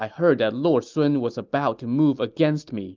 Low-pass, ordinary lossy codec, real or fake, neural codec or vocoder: 7.2 kHz; Opus, 24 kbps; real; none